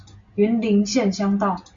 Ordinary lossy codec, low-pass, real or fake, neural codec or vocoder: Opus, 64 kbps; 7.2 kHz; real; none